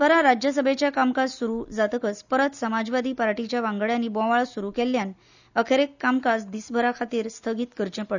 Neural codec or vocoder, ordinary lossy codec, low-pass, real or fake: none; none; 7.2 kHz; real